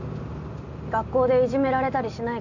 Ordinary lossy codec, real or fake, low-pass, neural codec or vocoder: none; real; 7.2 kHz; none